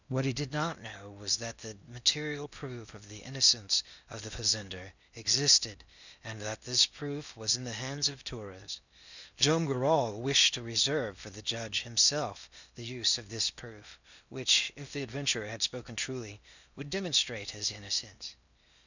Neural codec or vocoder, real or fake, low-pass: codec, 16 kHz in and 24 kHz out, 0.8 kbps, FocalCodec, streaming, 65536 codes; fake; 7.2 kHz